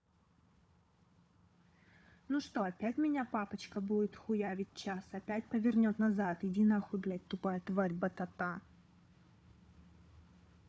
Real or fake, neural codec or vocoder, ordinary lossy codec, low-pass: fake; codec, 16 kHz, 4 kbps, FunCodec, trained on Chinese and English, 50 frames a second; none; none